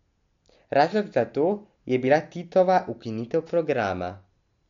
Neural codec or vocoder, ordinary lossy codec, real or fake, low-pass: none; MP3, 48 kbps; real; 7.2 kHz